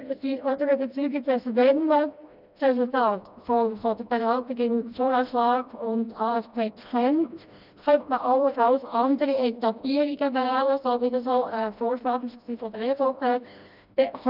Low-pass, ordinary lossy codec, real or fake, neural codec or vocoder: 5.4 kHz; none; fake; codec, 16 kHz, 1 kbps, FreqCodec, smaller model